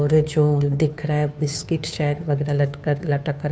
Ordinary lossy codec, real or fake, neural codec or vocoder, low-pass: none; fake; codec, 16 kHz, 2 kbps, FunCodec, trained on Chinese and English, 25 frames a second; none